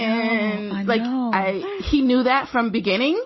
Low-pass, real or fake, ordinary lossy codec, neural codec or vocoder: 7.2 kHz; real; MP3, 24 kbps; none